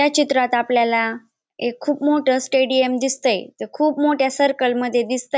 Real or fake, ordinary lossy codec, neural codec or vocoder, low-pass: real; none; none; none